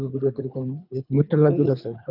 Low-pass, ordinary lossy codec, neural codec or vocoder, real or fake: 5.4 kHz; none; codec, 24 kHz, 3 kbps, HILCodec; fake